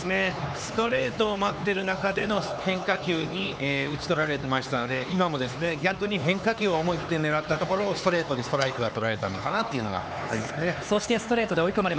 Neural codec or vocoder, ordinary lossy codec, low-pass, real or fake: codec, 16 kHz, 4 kbps, X-Codec, HuBERT features, trained on LibriSpeech; none; none; fake